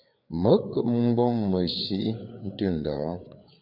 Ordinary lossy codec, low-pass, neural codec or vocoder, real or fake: AAC, 48 kbps; 5.4 kHz; codec, 16 kHz, 4 kbps, FreqCodec, larger model; fake